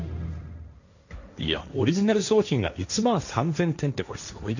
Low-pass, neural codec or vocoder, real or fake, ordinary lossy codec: 7.2 kHz; codec, 16 kHz, 1.1 kbps, Voila-Tokenizer; fake; none